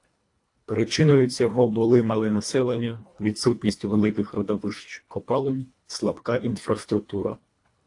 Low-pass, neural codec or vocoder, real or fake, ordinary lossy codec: 10.8 kHz; codec, 24 kHz, 1.5 kbps, HILCodec; fake; AAC, 48 kbps